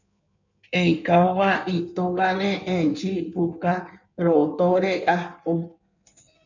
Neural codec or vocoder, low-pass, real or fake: codec, 16 kHz in and 24 kHz out, 1.1 kbps, FireRedTTS-2 codec; 7.2 kHz; fake